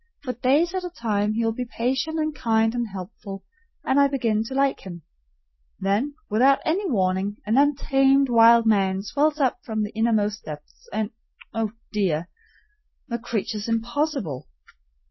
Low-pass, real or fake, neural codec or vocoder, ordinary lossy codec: 7.2 kHz; real; none; MP3, 24 kbps